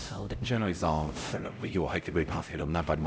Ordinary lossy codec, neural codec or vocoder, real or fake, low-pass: none; codec, 16 kHz, 0.5 kbps, X-Codec, HuBERT features, trained on LibriSpeech; fake; none